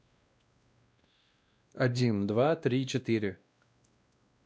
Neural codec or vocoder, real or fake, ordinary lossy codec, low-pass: codec, 16 kHz, 1 kbps, X-Codec, WavLM features, trained on Multilingual LibriSpeech; fake; none; none